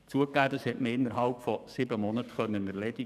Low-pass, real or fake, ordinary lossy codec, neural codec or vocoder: 14.4 kHz; fake; none; codec, 44.1 kHz, 7.8 kbps, DAC